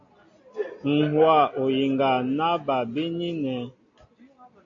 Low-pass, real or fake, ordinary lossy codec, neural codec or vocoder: 7.2 kHz; real; AAC, 48 kbps; none